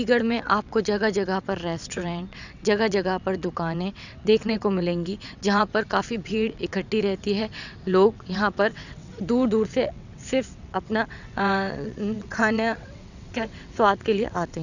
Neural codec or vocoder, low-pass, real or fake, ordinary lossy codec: vocoder, 22.05 kHz, 80 mel bands, WaveNeXt; 7.2 kHz; fake; none